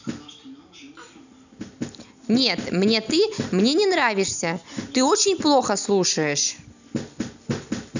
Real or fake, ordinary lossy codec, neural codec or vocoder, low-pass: real; none; none; 7.2 kHz